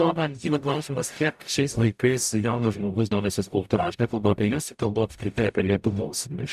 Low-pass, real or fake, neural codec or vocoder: 14.4 kHz; fake; codec, 44.1 kHz, 0.9 kbps, DAC